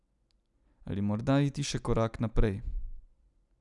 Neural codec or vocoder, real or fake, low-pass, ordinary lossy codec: none; real; 10.8 kHz; none